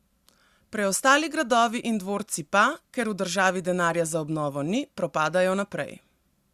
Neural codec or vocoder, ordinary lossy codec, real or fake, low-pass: none; Opus, 64 kbps; real; 14.4 kHz